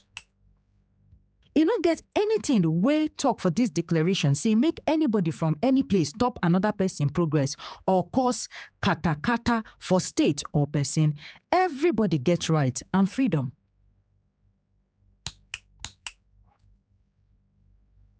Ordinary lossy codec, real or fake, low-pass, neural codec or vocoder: none; fake; none; codec, 16 kHz, 4 kbps, X-Codec, HuBERT features, trained on general audio